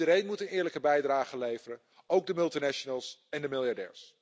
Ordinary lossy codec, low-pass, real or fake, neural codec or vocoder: none; none; real; none